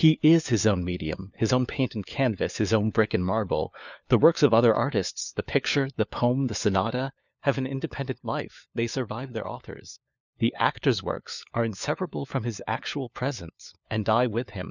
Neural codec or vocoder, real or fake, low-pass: codec, 16 kHz, 4 kbps, FunCodec, trained on LibriTTS, 50 frames a second; fake; 7.2 kHz